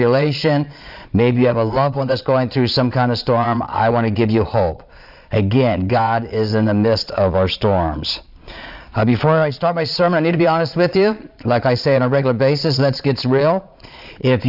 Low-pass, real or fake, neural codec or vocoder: 5.4 kHz; fake; vocoder, 22.05 kHz, 80 mel bands, Vocos